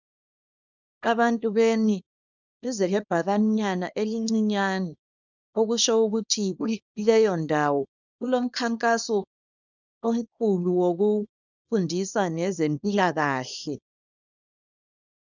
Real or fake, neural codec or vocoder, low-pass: fake; codec, 24 kHz, 0.9 kbps, WavTokenizer, small release; 7.2 kHz